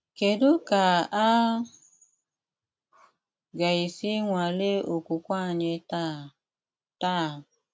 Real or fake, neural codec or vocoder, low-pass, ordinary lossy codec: real; none; none; none